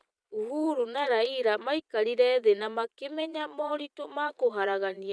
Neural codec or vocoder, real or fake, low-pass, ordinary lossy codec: vocoder, 22.05 kHz, 80 mel bands, Vocos; fake; none; none